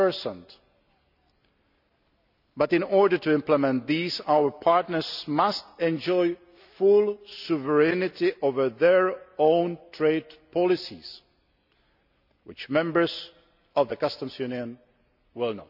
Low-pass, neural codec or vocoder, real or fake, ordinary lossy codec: 5.4 kHz; none; real; none